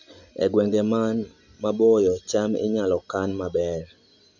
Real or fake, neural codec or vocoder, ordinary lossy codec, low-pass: real; none; none; 7.2 kHz